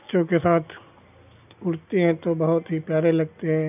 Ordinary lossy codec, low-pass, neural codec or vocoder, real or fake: none; 3.6 kHz; none; real